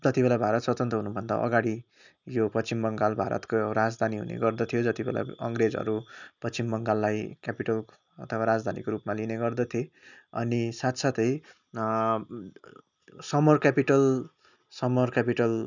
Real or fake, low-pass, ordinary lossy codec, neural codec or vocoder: real; 7.2 kHz; none; none